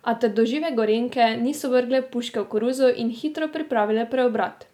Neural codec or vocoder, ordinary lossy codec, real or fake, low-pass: none; none; real; 19.8 kHz